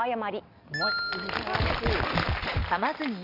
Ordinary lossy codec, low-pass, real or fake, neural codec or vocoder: none; 5.4 kHz; real; none